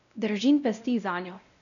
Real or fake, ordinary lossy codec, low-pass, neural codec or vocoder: fake; none; 7.2 kHz; codec, 16 kHz, 0.5 kbps, X-Codec, WavLM features, trained on Multilingual LibriSpeech